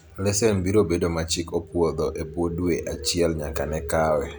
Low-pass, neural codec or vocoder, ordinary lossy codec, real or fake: none; none; none; real